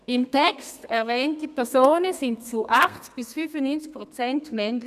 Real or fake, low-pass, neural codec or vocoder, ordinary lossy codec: fake; 14.4 kHz; codec, 32 kHz, 1.9 kbps, SNAC; none